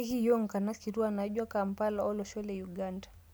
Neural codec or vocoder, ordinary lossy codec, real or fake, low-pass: none; none; real; none